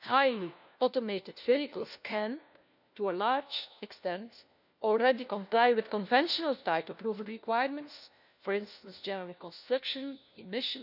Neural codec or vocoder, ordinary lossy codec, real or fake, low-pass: codec, 16 kHz, 1 kbps, FunCodec, trained on LibriTTS, 50 frames a second; none; fake; 5.4 kHz